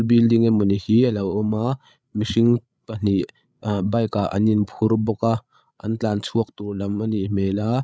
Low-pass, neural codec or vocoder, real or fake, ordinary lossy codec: none; codec, 16 kHz, 8 kbps, FreqCodec, larger model; fake; none